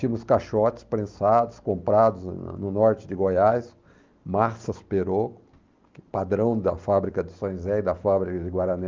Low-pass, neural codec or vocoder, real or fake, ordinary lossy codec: 7.2 kHz; none; real; Opus, 32 kbps